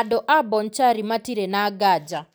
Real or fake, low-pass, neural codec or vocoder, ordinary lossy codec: real; none; none; none